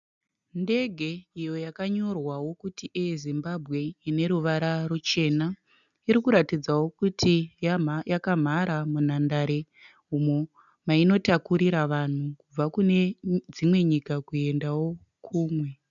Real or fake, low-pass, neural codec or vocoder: real; 7.2 kHz; none